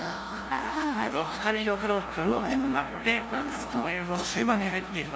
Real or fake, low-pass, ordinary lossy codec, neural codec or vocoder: fake; none; none; codec, 16 kHz, 0.5 kbps, FunCodec, trained on LibriTTS, 25 frames a second